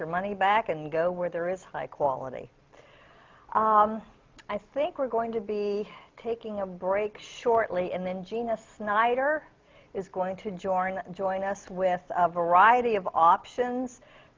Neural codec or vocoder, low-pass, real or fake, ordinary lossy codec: none; 7.2 kHz; real; Opus, 24 kbps